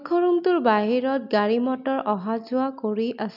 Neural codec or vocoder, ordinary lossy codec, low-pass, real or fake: none; none; 5.4 kHz; real